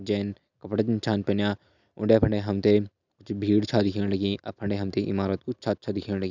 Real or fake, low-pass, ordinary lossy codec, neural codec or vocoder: real; 7.2 kHz; none; none